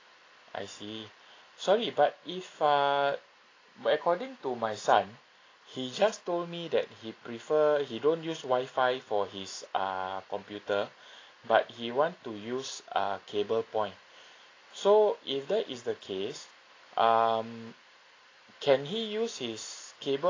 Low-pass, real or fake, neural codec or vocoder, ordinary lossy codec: 7.2 kHz; real; none; AAC, 32 kbps